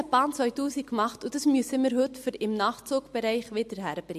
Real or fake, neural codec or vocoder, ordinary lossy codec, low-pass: real; none; MP3, 64 kbps; 14.4 kHz